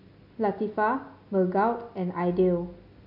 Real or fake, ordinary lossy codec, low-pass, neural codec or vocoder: real; AAC, 48 kbps; 5.4 kHz; none